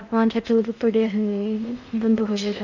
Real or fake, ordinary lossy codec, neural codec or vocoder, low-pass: fake; none; codec, 16 kHz in and 24 kHz out, 0.8 kbps, FocalCodec, streaming, 65536 codes; 7.2 kHz